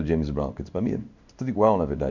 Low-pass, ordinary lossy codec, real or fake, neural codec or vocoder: 7.2 kHz; none; fake; codec, 16 kHz in and 24 kHz out, 1 kbps, XY-Tokenizer